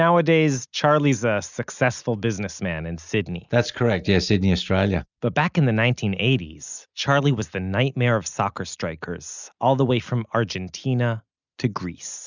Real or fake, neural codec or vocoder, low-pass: real; none; 7.2 kHz